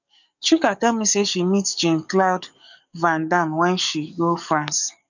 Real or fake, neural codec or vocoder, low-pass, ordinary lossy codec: fake; codec, 44.1 kHz, 7.8 kbps, DAC; 7.2 kHz; none